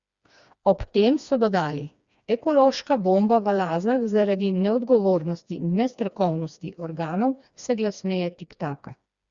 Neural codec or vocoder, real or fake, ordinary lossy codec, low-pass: codec, 16 kHz, 2 kbps, FreqCodec, smaller model; fake; Opus, 64 kbps; 7.2 kHz